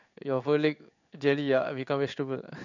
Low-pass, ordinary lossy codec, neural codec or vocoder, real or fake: 7.2 kHz; none; codec, 16 kHz in and 24 kHz out, 1 kbps, XY-Tokenizer; fake